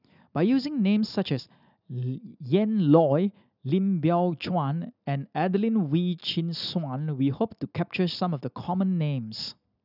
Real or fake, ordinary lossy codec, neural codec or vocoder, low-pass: real; none; none; 5.4 kHz